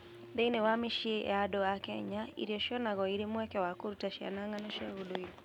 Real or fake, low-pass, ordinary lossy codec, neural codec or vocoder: real; 19.8 kHz; none; none